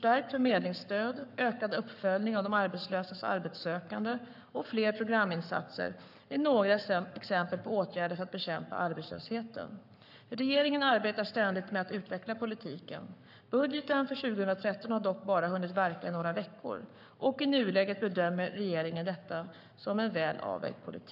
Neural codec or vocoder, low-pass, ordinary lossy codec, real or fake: codec, 44.1 kHz, 7.8 kbps, Pupu-Codec; 5.4 kHz; none; fake